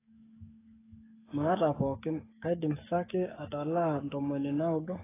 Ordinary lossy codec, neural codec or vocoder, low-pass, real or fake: AAC, 16 kbps; codec, 44.1 kHz, 7.8 kbps, DAC; 3.6 kHz; fake